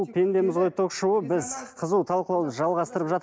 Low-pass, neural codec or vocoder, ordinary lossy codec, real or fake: none; none; none; real